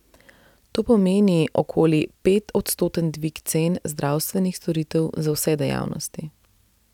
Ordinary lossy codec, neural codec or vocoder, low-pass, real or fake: none; none; 19.8 kHz; real